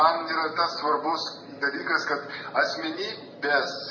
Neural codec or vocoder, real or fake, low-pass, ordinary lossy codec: none; real; 7.2 kHz; MP3, 32 kbps